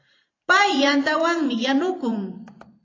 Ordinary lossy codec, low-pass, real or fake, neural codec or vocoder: AAC, 32 kbps; 7.2 kHz; fake; vocoder, 44.1 kHz, 128 mel bands every 512 samples, BigVGAN v2